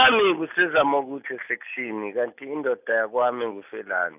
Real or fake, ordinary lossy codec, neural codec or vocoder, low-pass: real; none; none; 3.6 kHz